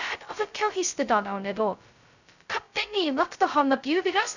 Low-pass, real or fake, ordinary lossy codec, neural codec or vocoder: 7.2 kHz; fake; none; codec, 16 kHz, 0.2 kbps, FocalCodec